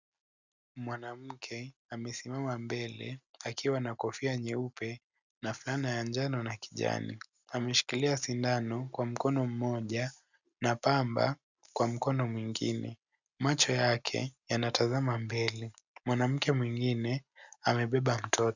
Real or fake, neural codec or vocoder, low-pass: real; none; 7.2 kHz